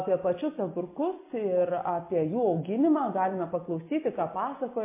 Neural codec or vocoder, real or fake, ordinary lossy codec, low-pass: none; real; MP3, 24 kbps; 3.6 kHz